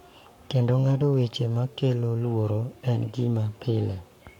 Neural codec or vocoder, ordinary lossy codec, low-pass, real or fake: codec, 44.1 kHz, 7.8 kbps, Pupu-Codec; none; 19.8 kHz; fake